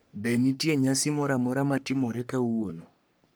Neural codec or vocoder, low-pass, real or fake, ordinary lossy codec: codec, 44.1 kHz, 3.4 kbps, Pupu-Codec; none; fake; none